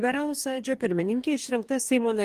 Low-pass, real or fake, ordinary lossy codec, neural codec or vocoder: 14.4 kHz; fake; Opus, 24 kbps; codec, 44.1 kHz, 2.6 kbps, DAC